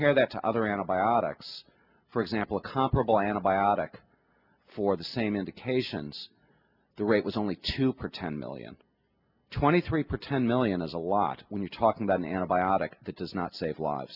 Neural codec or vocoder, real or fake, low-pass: none; real; 5.4 kHz